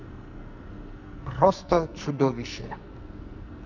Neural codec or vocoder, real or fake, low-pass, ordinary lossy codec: codec, 44.1 kHz, 2.6 kbps, SNAC; fake; 7.2 kHz; none